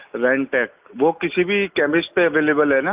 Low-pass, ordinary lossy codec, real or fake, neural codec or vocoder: 3.6 kHz; Opus, 24 kbps; real; none